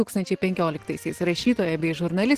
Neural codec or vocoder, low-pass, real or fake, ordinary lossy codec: none; 14.4 kHz; real; Opus, 16 kbps